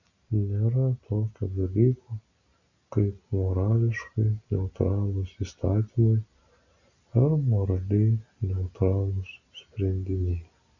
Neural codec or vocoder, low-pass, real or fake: none; 7.2 kHz; real